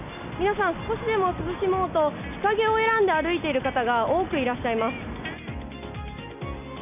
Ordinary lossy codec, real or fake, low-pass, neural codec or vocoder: none; real; 3.6 kHz; none